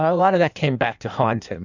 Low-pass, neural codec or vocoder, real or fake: 7.2 kHz; codec, 16 kHz in and 24 kHz out, 1.1 kbps, FireRedTTS-2 codec; fake